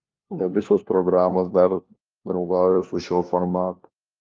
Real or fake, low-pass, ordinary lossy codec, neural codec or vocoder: fake; 7.2 kHz; Opus, 32 kbps; codec, 16 kHz, 1 kbps, FunCodec, trained on LibriTTS, 50 frames a second